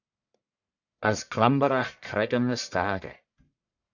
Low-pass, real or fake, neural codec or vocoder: 7.2 kHz; fake; codec, 44.1 kHz, 1.7 kbps, Pupu-Codec